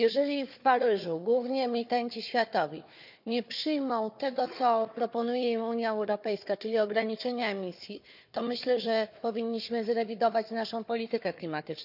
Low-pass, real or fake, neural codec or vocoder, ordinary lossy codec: 5.4 kHz; fake; codec, 16 kHz, 4 kbps, FunCodec, trained on Chinese and English, 50 frames a second; none